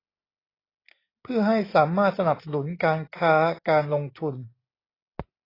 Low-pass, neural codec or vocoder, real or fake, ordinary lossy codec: 5.4 kHz; none; real; AAC, 24 kbps